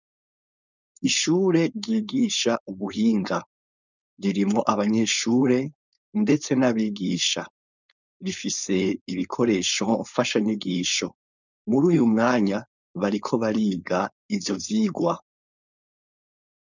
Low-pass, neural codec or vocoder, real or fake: 7.2 kHz; codec, 16 kHz, 4.8 kbps, FACodec; fake